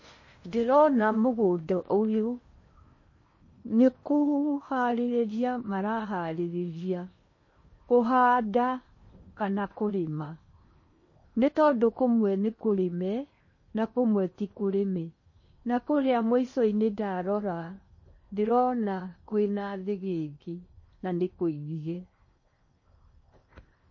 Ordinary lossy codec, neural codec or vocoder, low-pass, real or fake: MP3, 32 kbps; codec, 16 kHz in and 24 kHz out, 0.8 kbps, FocalCodec, streaming, 65536 codes; 7.2 kHz; fake